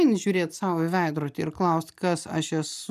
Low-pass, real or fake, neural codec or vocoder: 14.4 kHz; real; none